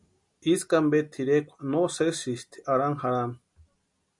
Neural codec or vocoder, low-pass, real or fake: none; 10.8 kHz; real